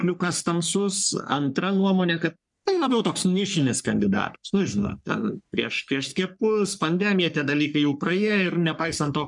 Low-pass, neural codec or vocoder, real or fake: 10.8 kHz; codec, 44.1 kHz, 3.4 kbps, Pupu-Codec; fake